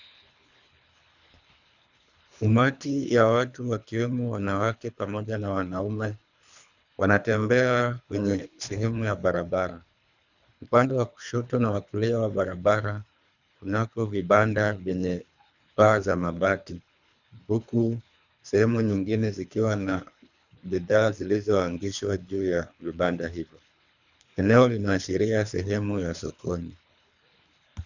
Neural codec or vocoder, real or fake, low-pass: codec, 24 kHz, 3 kbps, HILCodec; fake; 7.2 kHz